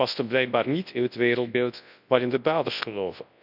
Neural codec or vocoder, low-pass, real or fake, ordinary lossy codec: codec, 24 kHz, 0.9 kbps, WavTokenizer, large speech release; 5.4 kHz; fake; none